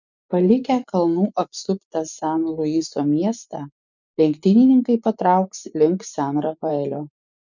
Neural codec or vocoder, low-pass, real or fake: vocoder, 24 kHz, 100 mel bands, Vocos; 7.2 kHz; fake